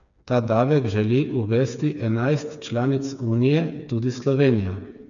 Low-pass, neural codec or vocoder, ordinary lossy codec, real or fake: 7.2 kHz; codec, 16 kHz, 4 kbps, FreqCodec, smaller model; none; fake